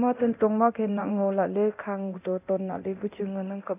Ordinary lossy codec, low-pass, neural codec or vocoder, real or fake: none; 3.6 kHz; codec, 24 kHz, 0.9 kbps, DualCodec; fake